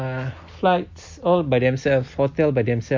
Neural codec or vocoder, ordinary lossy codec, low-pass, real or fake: none; MP3, 48 kbps; 7.2 kHz; real